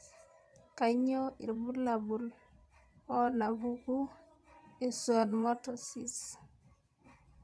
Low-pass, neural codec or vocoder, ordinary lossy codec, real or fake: none; vocoder, 22.05 kHz, 80 mel bands, Vocos; none; fake